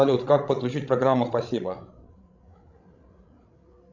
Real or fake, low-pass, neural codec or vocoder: fake; 7.2 kHz; codec, 16 kHz, 16 kbps, FreqCodec, larger model